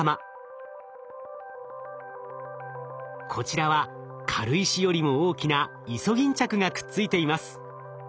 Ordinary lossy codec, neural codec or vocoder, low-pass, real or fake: none; none; none; real